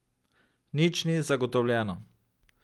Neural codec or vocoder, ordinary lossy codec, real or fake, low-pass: none; Opus, 32 kbps; real; 19.8 kHz